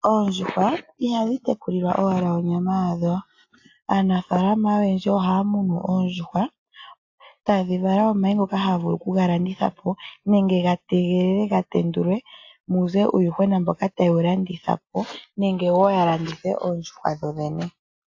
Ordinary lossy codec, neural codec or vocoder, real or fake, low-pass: AAC, 48 kbps; none; real; 7.2 kHz